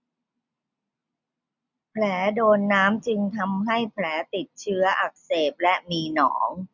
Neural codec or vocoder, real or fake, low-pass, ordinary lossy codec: none; real; 7.2 kHz; none